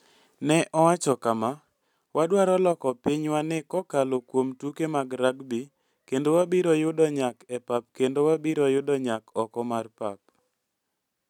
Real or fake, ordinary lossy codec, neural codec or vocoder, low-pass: real; none; none; 19.8 kHz